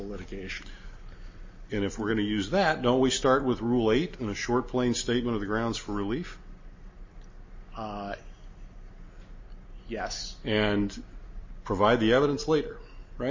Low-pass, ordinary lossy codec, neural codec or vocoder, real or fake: 7.2 kHz; MP3, 32 kbps; none; real